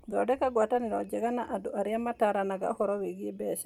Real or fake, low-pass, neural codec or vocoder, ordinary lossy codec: fake; 19.8 kHz; vocoder, 44.1 kHz, 128 mel bands every 256 samples, BigVGAN v2; none